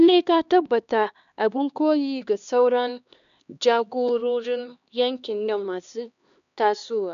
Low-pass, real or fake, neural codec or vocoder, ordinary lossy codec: 7.2 kHz; fake; codec, 16 kHz, 2 kbps, X-Codec, HuBERT features, trained on LibriSpeech; none